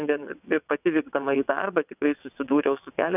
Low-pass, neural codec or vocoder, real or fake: 3.6 kHz; vocoder, 22.05 kHz, 80 mel bands, WaveNeXt; fake